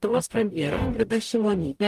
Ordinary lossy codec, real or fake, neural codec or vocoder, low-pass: Opus, 32 kbps; fake; codec, 44.1 kHz, 0.9 kbps, DAC; 14.4 kHz